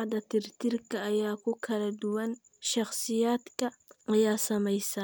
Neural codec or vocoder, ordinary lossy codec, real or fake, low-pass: none; none; real; none